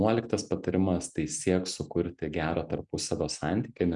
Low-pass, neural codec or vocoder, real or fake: 10.8 kHz; none; real